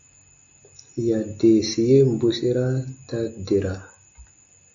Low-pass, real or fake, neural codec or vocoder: 7.2 kHz; real; none